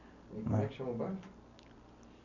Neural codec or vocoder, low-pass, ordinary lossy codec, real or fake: none; 7.2 kHz; none; real